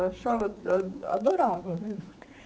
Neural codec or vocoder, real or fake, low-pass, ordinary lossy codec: codec, 16 kHz, 4 kbps, X-Codec, HuBERT features, trained on general audio; fake; none; none